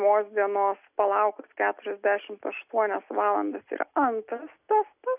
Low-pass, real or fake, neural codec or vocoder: 3.6 kHz; real; none